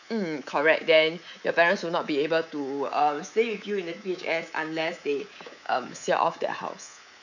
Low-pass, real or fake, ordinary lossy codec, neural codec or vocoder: 7.2 kHz; fake; none; codec, 24 kHz, 3.1 kbps, DualCodec